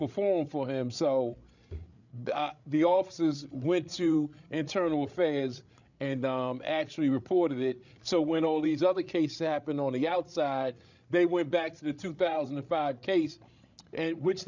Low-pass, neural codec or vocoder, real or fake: 7.2 kHz; codec, 16 kHz, 8 kbps, FreqCodec, larger model; fake